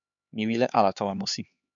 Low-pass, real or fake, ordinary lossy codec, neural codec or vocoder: 7.2 kHz; fake; MP3, 96 kbps; codec, 16 kHz, 4 kbps, X-Codec, HuBERT features, trained on LibriSpeech